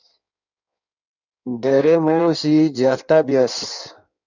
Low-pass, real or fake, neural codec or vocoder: 7.2 kHz; fake; codec, 16 kHz in and 24 kHz out, 1.1 kbps, FireRedTTS-2 codec